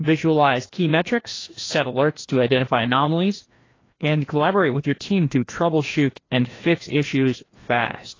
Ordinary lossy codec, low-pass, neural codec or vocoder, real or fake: AAC, 32 kbps; 7.2 kHz; codec, 16 kHz, 1 kbps, FreqCodec, larger model; fake